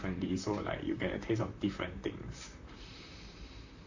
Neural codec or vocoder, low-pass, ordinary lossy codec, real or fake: vocoder, 44.1 kHz, 128 mel bands, Pupu-Vocoder; 7.2 kHz; AAC, 48 kbps; fake